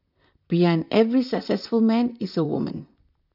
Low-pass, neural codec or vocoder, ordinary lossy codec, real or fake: 5.4 kHz; vocoder, 44.1 kHz, 128 mel bands, Pupu-Vocoder; none; fake